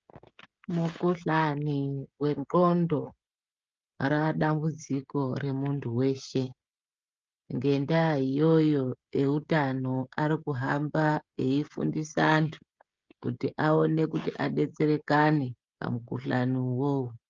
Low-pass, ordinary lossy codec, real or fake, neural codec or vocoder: 7.2 kHz; Opus, 24 kbps; fake; codec, 16 kHz, 16 kbps, FreqCodec, smaller model